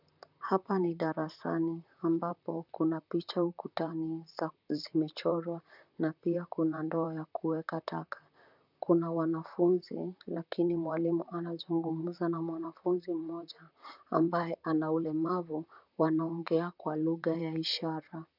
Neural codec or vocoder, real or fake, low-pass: vocoder, 44.1 kHz, 128 mel bands, Pupu-Vocoder; fake; 5.4 kHz